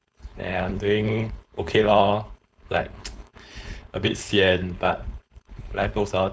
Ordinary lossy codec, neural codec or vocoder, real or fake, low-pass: none; codec, 16 kHz, 4.8 kbps, FACodec; fake; none